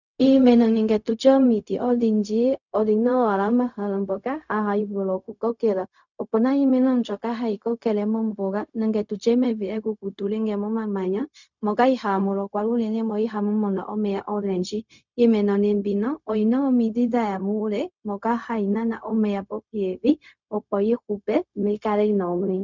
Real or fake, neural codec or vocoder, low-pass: fake; codec, 16 kHz, 0.4 kbps, LongCat-Audio-Codec; 7.2 kHz